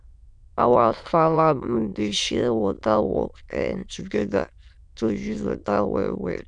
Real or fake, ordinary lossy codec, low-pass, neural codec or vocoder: fake; MP3, 96 kbps; 9.9 kHz; autoencoder, 22.05 kHz, a latent of 192 numbers a frame, VITS, trained on many speakers